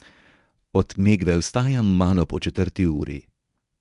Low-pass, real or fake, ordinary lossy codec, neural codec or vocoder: 10.8 kHz; fake; none; codec, 24 kHz, 0.9 kbps, WavTokenizer, medium speech release version 1